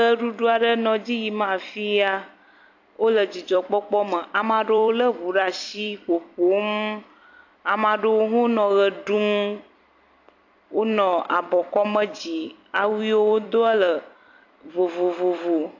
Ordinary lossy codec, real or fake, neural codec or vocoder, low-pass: AAC, 48 kbps; fake; vocoder, 44.1 kHz, 128 mel bands every 256 samples, BigVGAN v2; 7.2 kHz